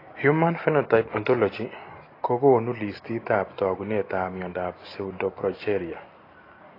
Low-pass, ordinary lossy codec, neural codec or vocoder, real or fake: 5.4 kHz; AAC, 24 kbps; none; real